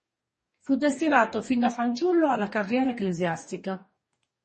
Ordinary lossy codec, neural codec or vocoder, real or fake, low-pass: MP3, 32 kbps; codec, 44.1 kHz, 2.6 kbps, DAC; fake; 10.8 kHz